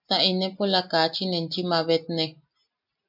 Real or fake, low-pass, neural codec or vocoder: real; 5.4 kHz; none